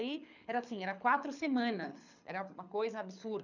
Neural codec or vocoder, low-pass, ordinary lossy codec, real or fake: codec, 24 kHz, 6 kbps, HILCodec; 7.2 kHz; none; fake